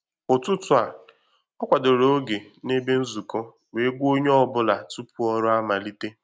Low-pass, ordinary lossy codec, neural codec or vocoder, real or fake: none; none; none; real